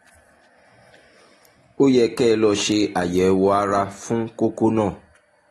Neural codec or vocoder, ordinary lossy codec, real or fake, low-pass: none; AAC, 32 kbps; real; 19.8 kHz